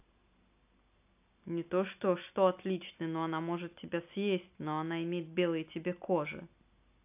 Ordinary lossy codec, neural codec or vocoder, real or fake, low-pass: AAC, 32 kbps; none; real; 3.6 kHz